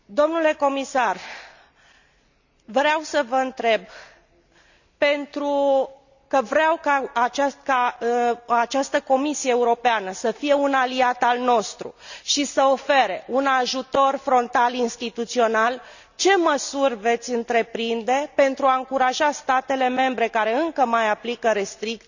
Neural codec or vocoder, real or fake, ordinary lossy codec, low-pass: none; real; none; 7.2 kHz